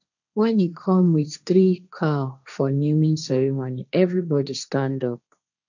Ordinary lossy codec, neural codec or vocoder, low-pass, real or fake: none; codec, 16 kHz, 1.1 kbps, Voila-Tokenizer; 7.2 kHz; fake